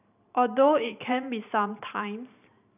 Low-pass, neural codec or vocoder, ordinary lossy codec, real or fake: 3.6 kHz; vocoder, 44.1 kHz, 128 mel bands every 512 samples, BigVGAN v2; none; fake